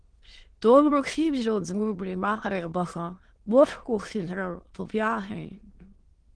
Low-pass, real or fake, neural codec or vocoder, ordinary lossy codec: 9.9 kHz; fake; autoencoder, 22.05 kHz, a latent of 192 numbers a frame, VITS, trained on many speakers; Opus, 16 kbps